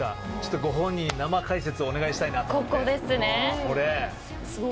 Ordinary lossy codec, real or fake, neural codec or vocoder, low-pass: none; real; none; none